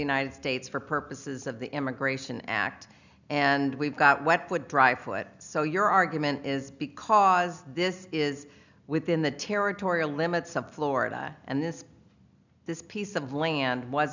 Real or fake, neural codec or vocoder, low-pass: real; none; 7.2 kHz